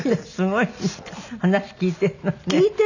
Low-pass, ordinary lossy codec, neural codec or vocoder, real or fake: 7.2 kHz; none; none; real